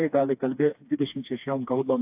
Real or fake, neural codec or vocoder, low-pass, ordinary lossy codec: fake; codec, 16 kHz, 2 kbps, FreqCodec, smaller model; 3.6 kHz; AAC, 32 kbps